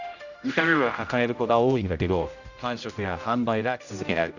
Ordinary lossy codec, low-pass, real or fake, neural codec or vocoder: none; 7.2 kHz; fake; codec, 16 kHz, 0.5 kbps, X-Codec, HuBERT features, trained on general audio